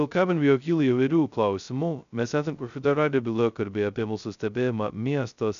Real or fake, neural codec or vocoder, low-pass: fake; codec, 16 kHz, 0.2 kbps, FocalCodec; 7.2 kHz